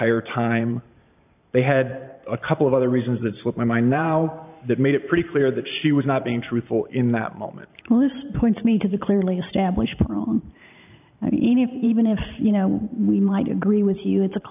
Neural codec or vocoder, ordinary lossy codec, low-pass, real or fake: vocoder, 44.1 kHz, 128 mel bands every 512 samples, BigVGAN v2; AAC, 32 kbps; 3.6 kHz; fake